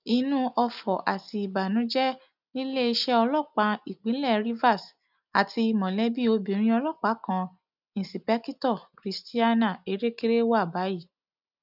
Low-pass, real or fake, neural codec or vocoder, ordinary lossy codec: 5.4 kHz; real; none; none